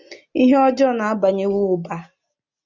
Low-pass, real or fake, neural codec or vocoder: 7.2 kHz; real; none